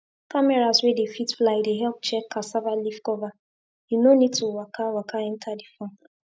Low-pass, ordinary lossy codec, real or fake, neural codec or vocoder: none; none; real; none